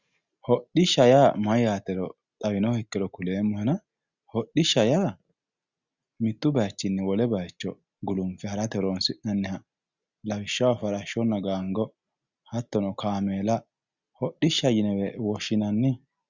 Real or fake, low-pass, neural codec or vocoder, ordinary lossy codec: real; 7.2 kHz; none; Opus, 64 kbps